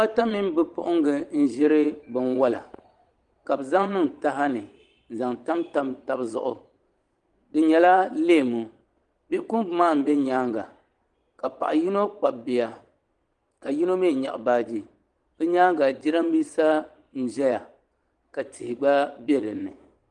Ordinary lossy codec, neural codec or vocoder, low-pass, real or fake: Opus, 24 kbps; vocoder, 22.05 kHz, 80 mel bands, Vocos; 9.9 kHz; fake